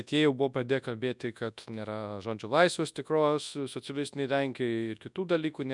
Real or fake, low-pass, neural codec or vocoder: fake; 10.8 kHz; codec, 24 kHz, 0.9 kbps, WavTokenizer, large speech release